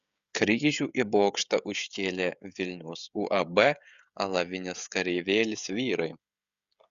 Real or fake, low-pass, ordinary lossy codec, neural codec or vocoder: fake; 7.2 kHz; Opus, 64 kbps; codec, 16 kHz, 16 kbps, FreqCodec, smaller model